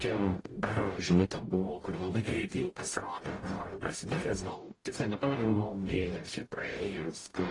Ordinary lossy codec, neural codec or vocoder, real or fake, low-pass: AAC, 32 kbps; codec, 44.1 kHz, 0.9 kbps, DAC; fake; 10.8 kHz